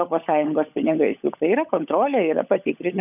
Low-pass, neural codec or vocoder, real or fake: 3.6 kHz; codec, 16 kHz, 16 kbps, FunCodec, trained on LibriTTS, 50 frames a second; fake